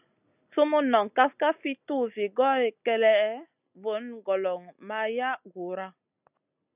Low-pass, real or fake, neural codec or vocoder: 3.6 kHz; real; none